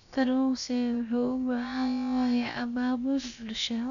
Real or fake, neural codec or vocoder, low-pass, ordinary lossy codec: fake; codec, 16 kHz, about 1 kbps, DyCAST, with the encoder's durations; 7.2 kHz; MP3, 96 kbps